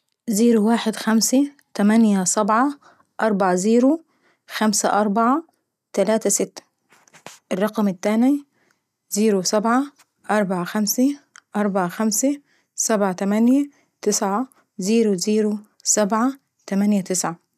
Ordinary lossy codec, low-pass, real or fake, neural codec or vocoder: none; 14.4 kHz; real; none